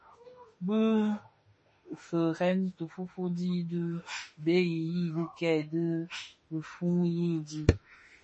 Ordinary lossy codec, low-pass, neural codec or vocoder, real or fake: MP3, 32 kbps; 10.8 kHz; autoencoder, 48 kHz, 32 numbers a frame, DAC-VAE, trained on Japanese speech; fake